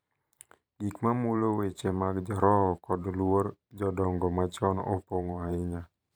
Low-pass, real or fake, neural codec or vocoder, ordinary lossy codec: none; fake; vocoder, 44.1 kHz, 128 mel bands every 512 samples, BigVGAN v2; none